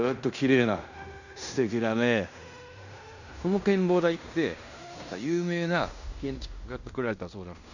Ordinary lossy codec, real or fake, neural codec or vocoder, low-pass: none; fake; codec, 16 kHz in and 24 kHz out, 0.9 kbps, LongCat-Audio-Codec, fine tuned four codebook decoder; 7.2 kHz